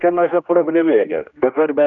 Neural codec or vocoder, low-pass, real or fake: codec, 16 kHz, 1 kbps, X-Codec, HuBERT features, trained on general audio; 7.2 kHz; fake